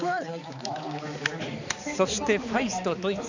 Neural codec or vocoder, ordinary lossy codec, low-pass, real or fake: codec, 16 kHz, 4 kbps, X-Codec, HuBERT features, trained on balanced general audio; none; 7.2 kHz; fake